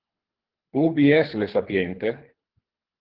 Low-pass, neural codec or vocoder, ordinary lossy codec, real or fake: 5.4 kHz; codec, 24 kHz, 3 kbps, HILCodec; Opus, 16 kbps; fake